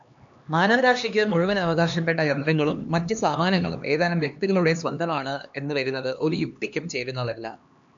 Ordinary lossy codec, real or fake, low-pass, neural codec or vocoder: AAC, 64 kbps; fake; 7.2 kHz; codec, 16 kHz, 2 kbps, X-Codec, HuBERT features, trained on LibriSpeech